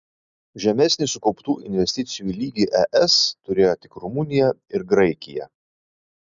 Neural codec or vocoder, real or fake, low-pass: none; real; 7.2 kHz